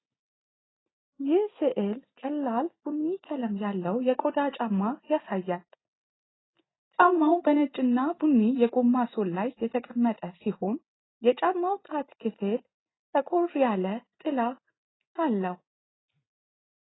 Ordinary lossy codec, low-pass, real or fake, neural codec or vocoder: AAC, 16 kbps; 7.2 kHz; real; none